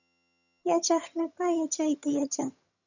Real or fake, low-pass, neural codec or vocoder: fake; 7.2 kHz; vocoder, 22.05 kHz, 80 mel bands, HiFi-GAN